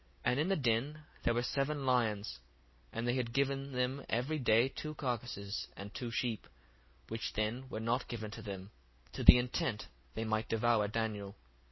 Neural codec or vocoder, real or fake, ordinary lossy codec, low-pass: none; real; MP3, 24 kbps; 7.2 kHz